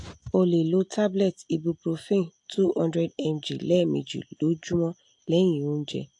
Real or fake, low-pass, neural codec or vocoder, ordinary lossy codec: real; 10.8 kHz; none; AAC, 64 kbps